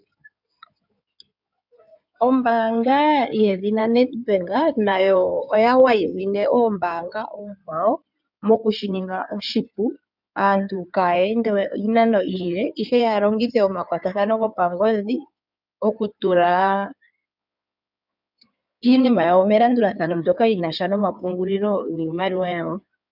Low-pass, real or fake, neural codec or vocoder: 5.4 kHz; fake; codec, 16 kHz in and 24 kHz out, 2.2 kbps, FireRedTTS-2 codec